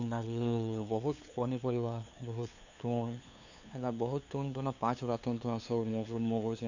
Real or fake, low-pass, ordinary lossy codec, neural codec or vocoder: fake; 7.2 kHz; none; codec, 16 kHz, 2 kbps, FunCodec, trained on LibriTTS, 25 frames a second